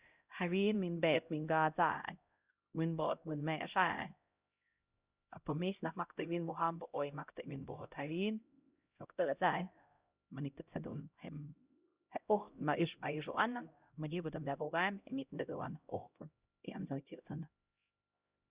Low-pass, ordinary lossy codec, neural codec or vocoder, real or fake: 3.6 kHz; Opus, 64 kbps; codec, 16 kHz, 0.5 kbps, X-Codec, HuBERT features, trained on LibriSpeech; fake